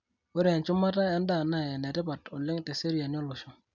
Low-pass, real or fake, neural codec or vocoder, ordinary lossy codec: 7.2 kHz; real; none; none